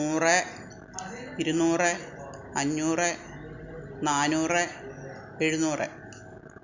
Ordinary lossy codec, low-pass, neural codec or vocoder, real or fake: none; 7.2 kHz; none; real